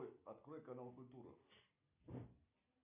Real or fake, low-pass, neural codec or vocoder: real; 3.6 kHz; none